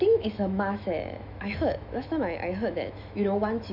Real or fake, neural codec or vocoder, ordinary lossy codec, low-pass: fake; autoencoder, 48 kHz, 128 numbers a frame, DAC-VAE, trained on Japanese speech; none; 5.4 kHz